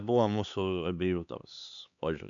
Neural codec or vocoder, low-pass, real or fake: codec, 16 kHz, 4 kbps, X-Codec, HuBERT features, trained on LibriSpeech; 7.2 kHz; fake